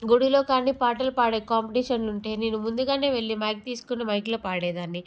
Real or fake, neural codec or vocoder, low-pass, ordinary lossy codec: real; none; none; none